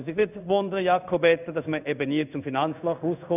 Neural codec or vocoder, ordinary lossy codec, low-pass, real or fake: codec, 16 kHz in and 24 kHz out, 1 kbps, XY-Tokenizer; none; 3.6 kHz; fake